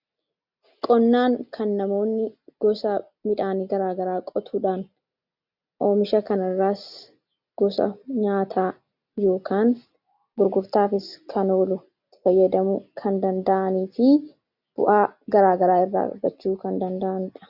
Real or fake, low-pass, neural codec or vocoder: real; 5.4 kHz; none